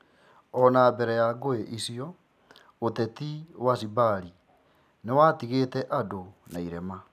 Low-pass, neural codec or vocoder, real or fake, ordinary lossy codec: 14.4 kHz; none; real; none